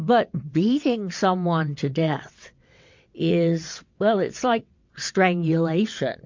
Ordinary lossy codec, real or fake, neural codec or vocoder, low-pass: MP3, 48 kbps; fake; vocoder, 22.05 kHz, 80 mel bands, WaveNeXt; 7.2 kHz